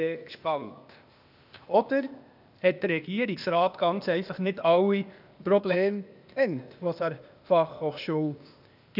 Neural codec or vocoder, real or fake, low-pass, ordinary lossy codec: codec, 16 kHz, 0.8 kbps, ZipCodec; fake; 5.4 kHz; none